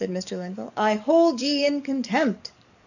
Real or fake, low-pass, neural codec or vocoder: fake; 7.2 kHz; codec, 44.1 kHz, 7.8 kbps, DAC